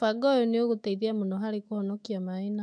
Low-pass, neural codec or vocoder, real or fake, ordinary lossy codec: 9.9 kHz; autoencoder, 48 kHz, 128 numbers a frame, DAC-VAE, trained on Japanese speech; fake; MP3, 64 kbps